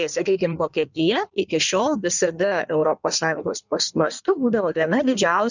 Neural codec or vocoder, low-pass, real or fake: codec, 16 kHz in and 24 kHz out, 1.1 kbps, FireRedTTS-2 codec; 7.2 kHz; fake